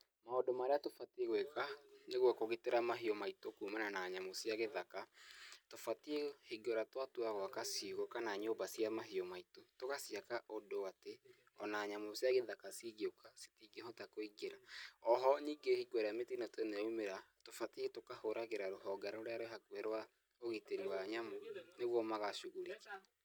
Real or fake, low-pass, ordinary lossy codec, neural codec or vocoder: real; none; none; none